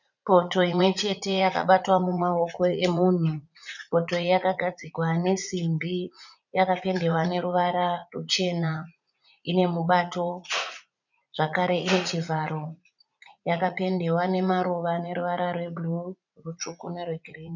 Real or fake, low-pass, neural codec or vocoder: fake; 7.2 kHz; vocoder, 44.1 kHz, 80 mel bands, Vocos